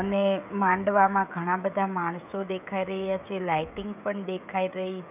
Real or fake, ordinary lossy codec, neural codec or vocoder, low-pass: fake; none; codec, 16 kHz, 16 kbps, FreqCodec, smaller model; 3.6 kHz